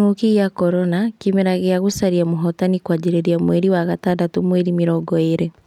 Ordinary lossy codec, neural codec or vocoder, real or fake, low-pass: none; none; real; 19.8 kHz